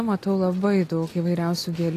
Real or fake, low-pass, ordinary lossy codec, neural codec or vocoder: real; 14.4 kHz; AAC, 48 kbps; none